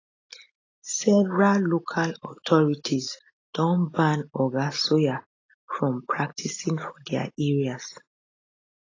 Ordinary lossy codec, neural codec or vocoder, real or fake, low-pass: AAC, 32 kbps; none; real; 7.2 kHz